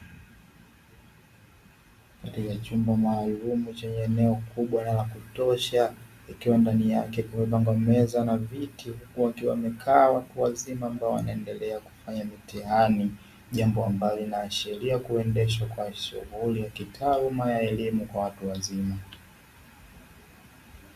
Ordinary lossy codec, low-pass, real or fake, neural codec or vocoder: Opus, 64 kbps; 14.4 kHz; real; none